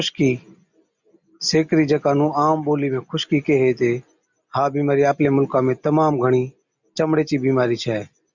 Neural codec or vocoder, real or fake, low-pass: none; real; 7.2 kHz